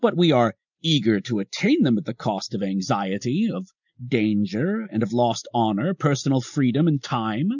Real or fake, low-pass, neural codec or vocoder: real; 7.2 kHz; none